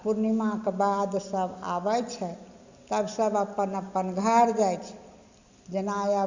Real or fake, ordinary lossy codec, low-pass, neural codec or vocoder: real; Opus, 64 kbps; 7.2 kHz; none